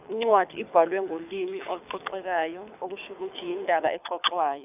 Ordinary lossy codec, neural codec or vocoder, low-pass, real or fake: none; codec, 24 kHz, 6 kbps, HILCodec; 3.6 kHz; fake